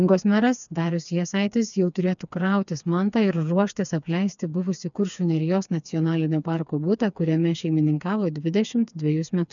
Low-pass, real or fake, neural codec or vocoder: 7.2 kHz; fake; codec, 16 kHz, 4 kbps, FreqCodec, smaller model